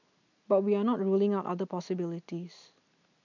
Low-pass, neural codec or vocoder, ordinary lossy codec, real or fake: 7.2 kHz; none; none; real